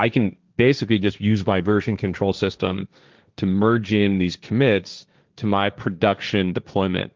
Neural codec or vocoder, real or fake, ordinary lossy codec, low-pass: codec, 16 kHz, 1.1 kbps, Voila-Tokenizer; fake; Opus, 32 kbps; 7.2 kHz